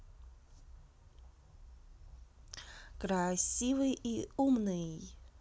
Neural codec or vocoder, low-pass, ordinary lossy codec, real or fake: none; none; none; real